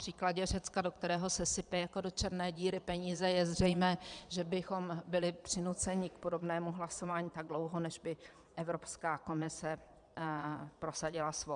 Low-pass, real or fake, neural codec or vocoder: 9.9 kHz; fake; vocoder, 22.05 kHz, 80 mel bands, Vocos